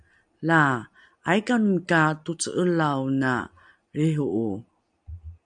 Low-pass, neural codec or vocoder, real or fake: 9.9 kHz; none; real